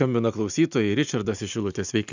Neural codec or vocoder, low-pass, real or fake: none; 7.2 kHz; real